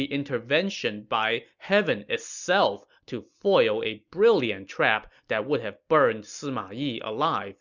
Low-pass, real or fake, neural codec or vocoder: 7.2 kHz; real; none